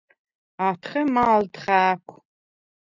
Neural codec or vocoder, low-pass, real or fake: none; 7.2 kHz; real